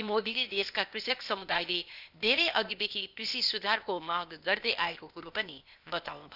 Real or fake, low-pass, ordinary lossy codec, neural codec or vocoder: fake; 5.4 kHz; none; codec, 16 kHz, 0.8 kbps, ZipCodec